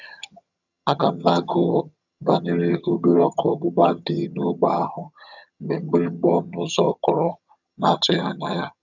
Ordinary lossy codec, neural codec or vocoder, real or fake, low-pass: none; vocoder, 22.05 kHz, 80 mel bands, HiFi-GAN; fake; 7.2 kHz